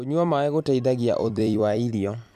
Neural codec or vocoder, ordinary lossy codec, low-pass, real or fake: vocoder, 44.1 kHz, 128 mel bands every 256 samples, BigVGAN v2; MP3, 96 kbps; 14.4 kHz; fake